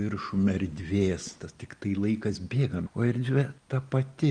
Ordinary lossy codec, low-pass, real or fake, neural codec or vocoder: Opus, 32 kbps; 9.9 kHz; real; none